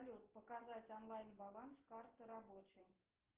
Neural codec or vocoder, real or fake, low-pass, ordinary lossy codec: none; real; 3.6 kHz; Opus, 16 kbps